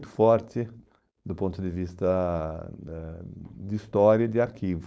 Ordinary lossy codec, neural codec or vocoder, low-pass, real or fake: none; codec, 16 kHz, 4.8 kbps, FACodec; none; fake